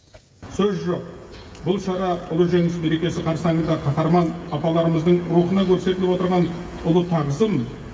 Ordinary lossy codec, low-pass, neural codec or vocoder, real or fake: none; none; codec, 16 kHz, 16 kbps, FreqCodec, smaller model; fake